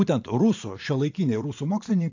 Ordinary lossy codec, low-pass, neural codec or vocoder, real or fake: AAC, 48 kbps; 7.2 kHz; vocoder, 44.1 kHz, 80 mel bands, Vocos; fake